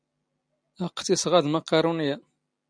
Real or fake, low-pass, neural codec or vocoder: real; 9.9 kHz; none